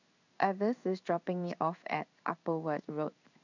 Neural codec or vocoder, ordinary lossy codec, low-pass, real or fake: codec, 16 kHz in and 24 kHz out, 1 kbps, XY-Tokenizer; none; 7.2 kHz; fake